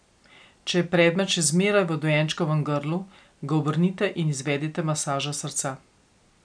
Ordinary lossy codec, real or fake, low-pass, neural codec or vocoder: none; real; 9.9 kHz; none